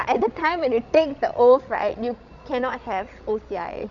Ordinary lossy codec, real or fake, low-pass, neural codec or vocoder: none; fake; 7.2 kHz; codec, 16 kHz, 16 kbps, FreqCodec, larger model